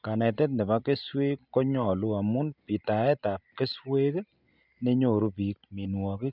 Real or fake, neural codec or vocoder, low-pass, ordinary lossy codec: real; none; 5.4 kHz; none